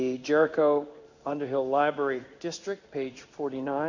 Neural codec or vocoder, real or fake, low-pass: codec, 16 kHz in and 24 kHz out, 1 kbps, XY-Tokenizer; fake; 7.2 kHz